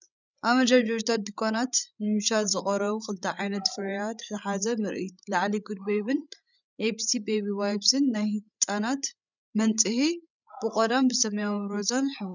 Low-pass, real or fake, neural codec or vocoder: 7.2 kHz; fake; codec, 16 kHz, 16 kbps, FreqCodec, larger model